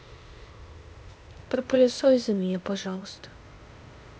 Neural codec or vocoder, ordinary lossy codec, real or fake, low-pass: codec, 16 kHz, 0.8 kbps, ZipCodec; none; fake; none